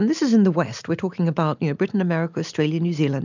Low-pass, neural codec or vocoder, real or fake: 7.2 kHz; none; real